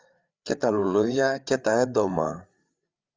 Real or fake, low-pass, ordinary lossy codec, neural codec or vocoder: fake; 7.2 kHz; Opus, 32 kbps; codec, 16 kHz, 8 kbps, FreqCodec, larger model